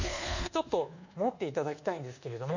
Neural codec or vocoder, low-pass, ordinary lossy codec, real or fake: codec, 24 kHz, 1.2 kbps, DualCodec; 7.2 kHz; none; fake